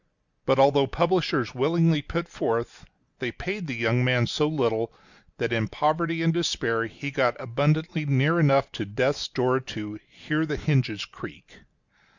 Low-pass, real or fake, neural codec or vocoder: 7.2 kHz; real; none